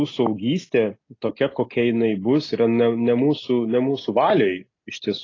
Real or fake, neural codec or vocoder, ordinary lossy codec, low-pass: real; none; AAC, 32 kbps; 7.2 kHz